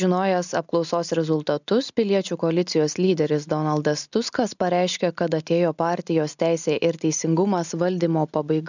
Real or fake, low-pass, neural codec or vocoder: real; 7.2 kHz; none